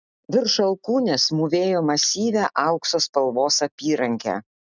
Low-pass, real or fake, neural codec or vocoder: 7.2 kHz; real; none